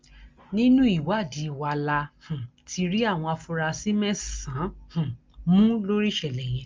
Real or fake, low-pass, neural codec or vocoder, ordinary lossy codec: real; 7.2 kHz; none; Opus, 32 kbps